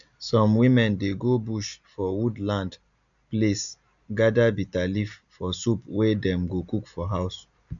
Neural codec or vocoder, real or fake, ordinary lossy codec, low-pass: none; real; none; 7.2 kHz